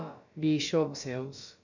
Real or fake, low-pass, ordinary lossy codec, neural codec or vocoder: fake; 7.2 kHz; none; codec, 16 kHz, about 1 kbps, DyCAST, with the encoder's durations